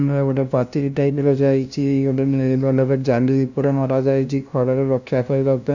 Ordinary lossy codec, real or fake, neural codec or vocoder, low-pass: none; fake; codec, 16 kHz, 0.5 kbps, FunCodec, trained on LibriTTS, 25 frames a second; 7.2 kHz